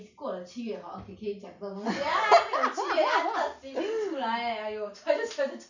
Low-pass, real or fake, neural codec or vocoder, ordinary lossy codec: 7.2 kHz; real; none; none